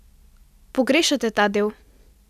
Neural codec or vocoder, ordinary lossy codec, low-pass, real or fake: none; none; 14.4 kHz; real